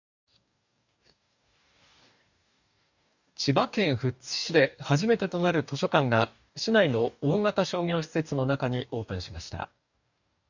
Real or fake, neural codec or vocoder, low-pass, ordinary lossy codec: fake; codec, 44.1 kHz, 2.6 kbps, DAC; 7.2 kHz; none